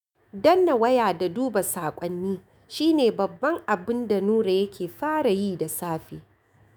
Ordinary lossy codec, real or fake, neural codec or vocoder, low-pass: none; fake; autoencoder, 48 kHz, 128 numbers a frame, DAC-VAE, trained on Japanese speech; none